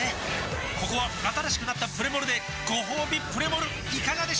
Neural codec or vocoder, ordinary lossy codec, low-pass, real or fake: none; none; none; real